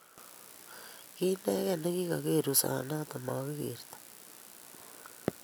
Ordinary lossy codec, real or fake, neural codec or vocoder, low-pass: none; real; none; none